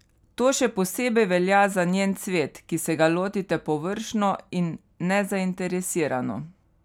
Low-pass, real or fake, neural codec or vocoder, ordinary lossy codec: 19.8 kHz; real; none; none